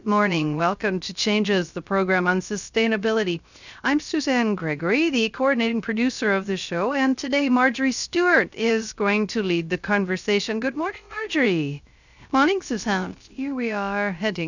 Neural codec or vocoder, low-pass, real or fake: codec, 16 kHz, 0.3 kbps, FocalCodec; 7.2 kHz; fake